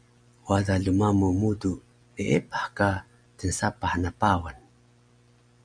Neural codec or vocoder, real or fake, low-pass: none; real; 9.9 kHz